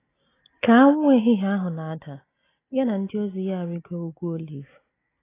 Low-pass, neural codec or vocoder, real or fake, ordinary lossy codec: 3.6 kHz; none; real; AAC, 16 kbps